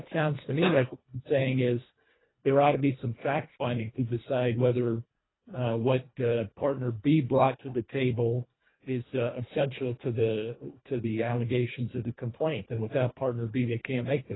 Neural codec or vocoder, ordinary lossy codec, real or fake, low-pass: codec, 24 kHz, 1.5 kbps, HILCodec; AAC, 16 kbps; fake; 7.2 kHz